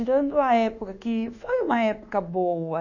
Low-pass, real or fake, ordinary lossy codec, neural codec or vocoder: 7.2 kHz; fake; none; codec, 24 kHz, 1.2 kbps, DualCodec